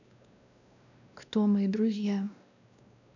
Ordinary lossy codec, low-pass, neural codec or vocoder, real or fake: none; 7.2 kHz; codec, 16 kHz, 1 kbps, X-Codec, WavLM features, trained on Multilingual LibriSpeech; fake